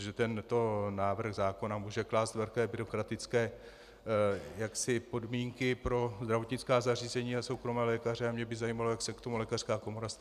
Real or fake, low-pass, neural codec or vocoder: real; 14.4 kHz; none